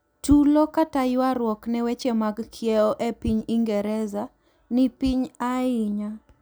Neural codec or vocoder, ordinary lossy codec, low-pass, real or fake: none; none; none; real